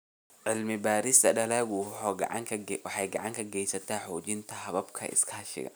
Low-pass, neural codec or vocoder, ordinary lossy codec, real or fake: none; none; none; real